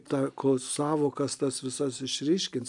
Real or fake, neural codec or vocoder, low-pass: real; none; 10.8 kHz